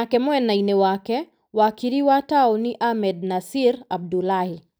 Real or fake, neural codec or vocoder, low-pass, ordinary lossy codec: real; none; none; none